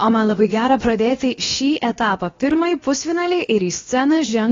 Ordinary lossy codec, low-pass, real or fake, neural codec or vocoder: AAC, 32 kbps; 7.2 kHz; fake; codec, 16 kHz, about 1 kbps, DyCAST, with the encoder's durations